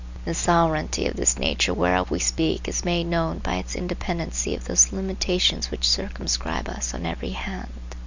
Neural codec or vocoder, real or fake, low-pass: none; real; 7.2 kHz